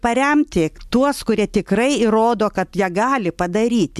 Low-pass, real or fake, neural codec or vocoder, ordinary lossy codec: 14.4 kHz; real; none; MP3, 96 kbps